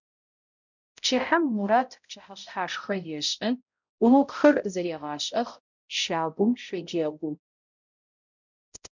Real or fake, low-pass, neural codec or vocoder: fake; 7.2 kHz; codec, 16 kHz, 0.5 kbps, X-Codec, HuBERT features, trained on balanced general audio